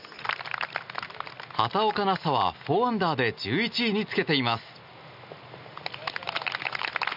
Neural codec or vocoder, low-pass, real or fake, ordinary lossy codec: none; 5.4 kHz; real; none